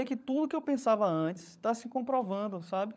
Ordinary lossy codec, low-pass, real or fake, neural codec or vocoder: none; none; fake; codec, 16 kHz, 16 kbps, FunCodec, trained on LibriTTS, 50 frames a second